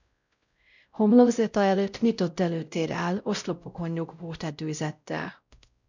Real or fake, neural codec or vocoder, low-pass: fake; codec, 16 kHz, 0.5 kbps, X-Codec, WavLM features, trained on Multilingual LibriSpeech; 7.2 kHz